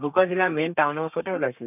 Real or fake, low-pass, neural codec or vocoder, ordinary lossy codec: fake; 3.6 kHz; codec, 32 kHz, 1.9 kbps, SNAC; none